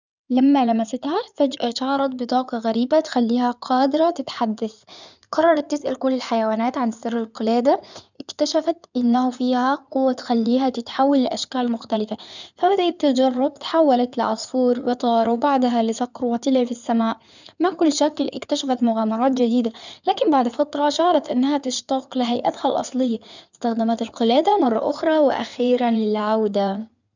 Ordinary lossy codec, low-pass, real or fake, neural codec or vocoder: none; 7.2 kHz; fake; codec, 16 kHz in and 24 kHz out, 2.2 kbps, FireRedTTS-2 codec